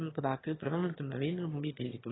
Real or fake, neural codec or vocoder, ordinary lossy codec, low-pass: fake; autoencoder, 22.05 kHz, a latent of 192 numbers a frame, VITS, trained on one speaker; AAC, 16 kbps; 7.2 kHz